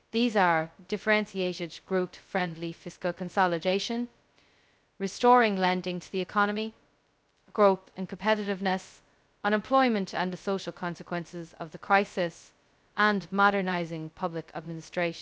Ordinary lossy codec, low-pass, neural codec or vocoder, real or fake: none; none; codec, 16 kHz, 0.2 kbps, FocalCodec; fake